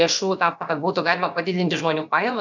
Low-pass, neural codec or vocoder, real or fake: 7.2 kHz; codec, 16 kHz, about 1 kbps, DyCAST, with the encoder's durations; fake